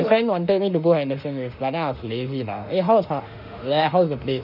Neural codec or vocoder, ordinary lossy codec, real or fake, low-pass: autoencoder, 48 kHz, 32 numbers a frame, DAC-VAE, trained on Japanese speech; none; fake; 5.4 kHz